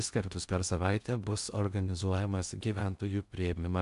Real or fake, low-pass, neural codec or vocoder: fake; 10.8 kHz; codec, 16 kHz in and 24 kHz out, 0.6 kbps, FocalCodec, streaming, 2048 codes